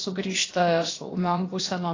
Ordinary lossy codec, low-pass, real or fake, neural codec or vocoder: AAC, 32 kbps; 7.2 kHz; fake; codec, 16 kHz, about 1 kbps, DyCAST, with the encoder's durations